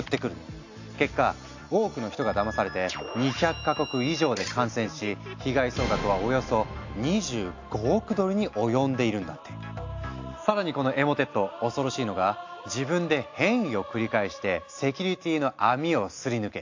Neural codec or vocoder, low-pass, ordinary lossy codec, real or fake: none; 7.2 kHz; AAC, 48 kbps; real